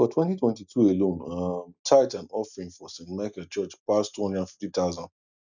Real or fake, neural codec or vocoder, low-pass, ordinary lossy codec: real; none; 7.2 kHz; none